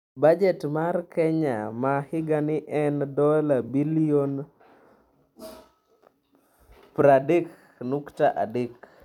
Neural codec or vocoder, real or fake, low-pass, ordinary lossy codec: vocoder, 44.1 kHz, 128 mel bands every 256 samples, BigVGAN v2; fake; 19.8 kHz; none